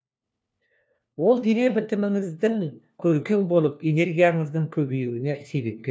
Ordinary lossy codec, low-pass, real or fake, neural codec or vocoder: none; none; fake; codec, 16 kHz, 1 kbps, FunCodec, trained on LibriTTS, 50 frames a second